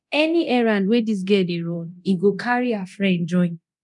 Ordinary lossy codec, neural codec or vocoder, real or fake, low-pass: none; codec, 24 kHz, 0.9 kbps, DualCodec; fake; 10.8 kHz